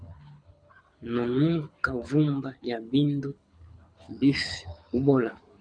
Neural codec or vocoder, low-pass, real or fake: codec, 24 kHz, 6 kbps, HILCodec; 9.9 kHz; fake